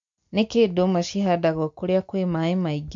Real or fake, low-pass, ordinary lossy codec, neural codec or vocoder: real; 7.2 kHz; MP3, 48 kbps; none